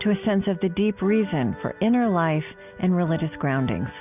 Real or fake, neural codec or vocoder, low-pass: real; none; 3.6 kHz